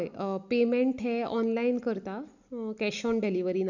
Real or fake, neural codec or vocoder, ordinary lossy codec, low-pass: real; none; none; 7.2 kHz